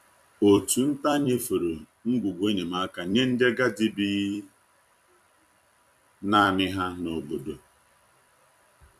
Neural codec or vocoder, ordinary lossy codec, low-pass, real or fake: vocoder, 44.1 kHz, 128 mel bands every 256 samples, BigVGAN v2; none; 14.4 kHz; fake